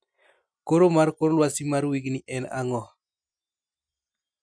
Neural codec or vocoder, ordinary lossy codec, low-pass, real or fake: none; none; 9.9 kHz; real